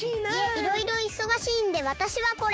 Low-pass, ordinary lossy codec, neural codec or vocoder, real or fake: none; none; codec, 16 kHz, 6 kbps, DAC; fake